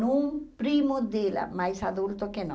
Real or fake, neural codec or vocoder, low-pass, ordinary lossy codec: real; none; none; none